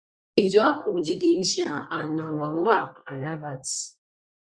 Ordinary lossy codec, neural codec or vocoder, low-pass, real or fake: Opus, 64 kbps; codec, 24 kHz, 1 kbps, SNAC; 9.9 kHz; fake